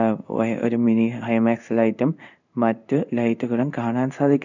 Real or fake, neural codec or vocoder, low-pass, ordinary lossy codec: fake; codec, 16 kHz in and 24 kHz out, 1 kbps, XY-Tokenizer; 7.2 kHz; none